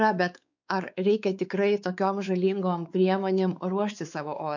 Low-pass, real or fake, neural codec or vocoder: 7.2 kHz; fake; codec, 16 kHz, 4 kbps, X-Codec, WavLM features, trained on Multilingual LibriSpeech